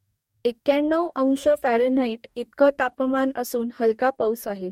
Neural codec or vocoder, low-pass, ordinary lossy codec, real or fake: codec, 44.1 kHz, 2.6 kbps, DAC; 19.8 kHz; MP3, 64 kbps; fake